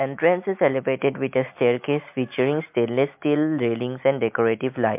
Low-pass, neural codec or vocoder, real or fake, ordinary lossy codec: 3.6 kHz; none; real; MP3, 32 kbps